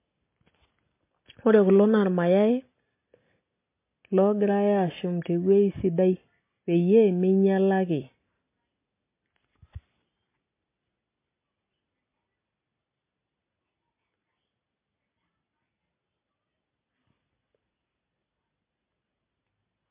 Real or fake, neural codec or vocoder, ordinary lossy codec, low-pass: real; none; MP3, 24 kbps; 3.6 kHz